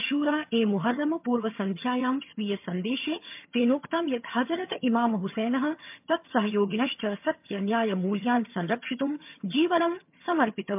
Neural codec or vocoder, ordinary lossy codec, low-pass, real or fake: vocoder, 22.05 kHz, 80 mel bands, HiFi-GAN; MP3, 32 kbps; 3.6 kHz; fake